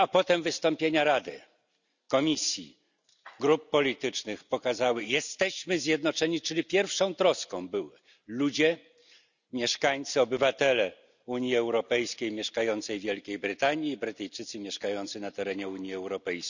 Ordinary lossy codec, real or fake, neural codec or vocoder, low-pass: none; real; none; 7.2 kHz